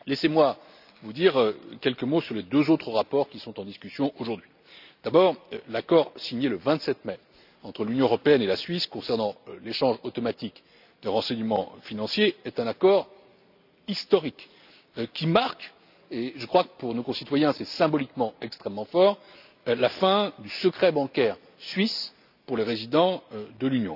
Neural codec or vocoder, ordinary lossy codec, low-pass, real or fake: none; none; 5.4 kHz; real